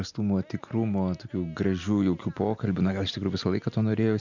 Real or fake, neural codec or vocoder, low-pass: real; none; 7.2 kHz